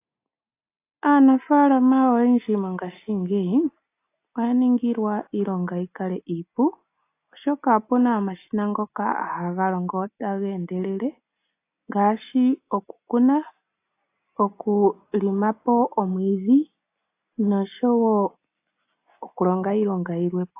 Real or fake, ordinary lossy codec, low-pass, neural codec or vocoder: real; AAC, 24 kbps; 3.6 kHz; none